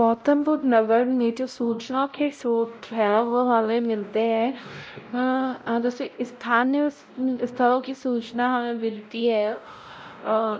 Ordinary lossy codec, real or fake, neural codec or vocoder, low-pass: none; fake; codec, 16 kHz, 0.5 kbps, X-Codec, WavLM features, trained on Multilingual LibriSpeech; none